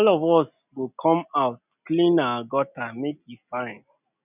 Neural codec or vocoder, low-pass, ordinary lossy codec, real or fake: none; 3.6 kHz; none; real